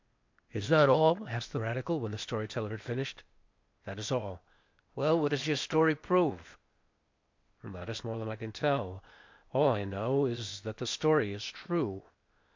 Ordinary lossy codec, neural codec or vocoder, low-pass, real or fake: MP3, 48 kbps; codec, 16 kHz, 0.8 kbps, ZipCodec; 7.2 kHz; fake